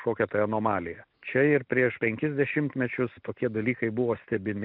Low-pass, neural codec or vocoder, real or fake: 5.4 kHz; none; real